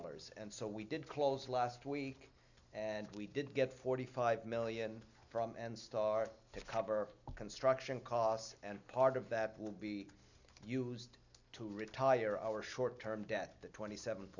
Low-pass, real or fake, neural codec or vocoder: 7.2 kHz; real; none